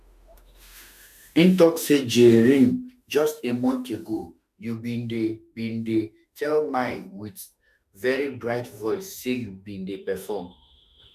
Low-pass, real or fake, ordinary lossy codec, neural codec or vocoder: 14.4 kHz; fake; none; autoencoder, 48 kHz, 32 numbers a frame, DAC-VAE, trained on Japanese speech